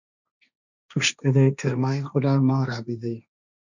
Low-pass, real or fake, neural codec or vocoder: 7.2 kHz; fake; codec, 16 kHz, 1.1 kbps, Voila-Tokenizer